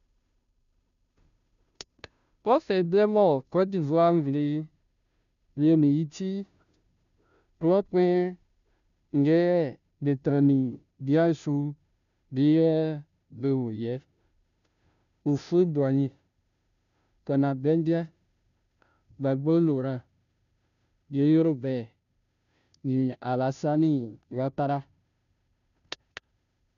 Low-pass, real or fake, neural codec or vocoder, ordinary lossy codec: 7.2 kHz; fake; codec, 16 kHz, 0.5 kbps, FunCodec, trained on Chinese and English, 25 frames a second; none